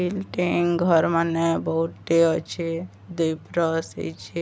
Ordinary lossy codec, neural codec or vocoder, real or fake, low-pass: none; none; real; none